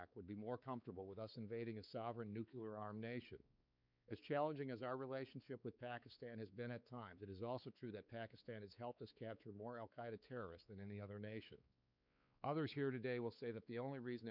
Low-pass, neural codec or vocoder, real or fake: 5.4 kHz; codec, 16 kHz, 4 kbps, X-Codec, WavLM features, trained on Multilingual LibriSpeech; fake